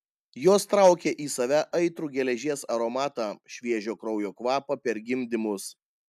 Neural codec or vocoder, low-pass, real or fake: none; 14.4 kHz; real